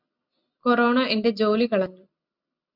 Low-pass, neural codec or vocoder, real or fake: 5.4 kHz; none; real